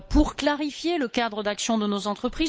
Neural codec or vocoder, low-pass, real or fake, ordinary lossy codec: codec, 16 kHz, 8 kbps, FunCodec, trained on Chinese and English, 25 frames a second; none; fake; none